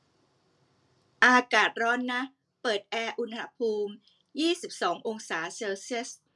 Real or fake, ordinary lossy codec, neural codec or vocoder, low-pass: real; none; none; none